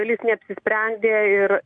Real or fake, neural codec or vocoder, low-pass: real; none; 9.9 kHz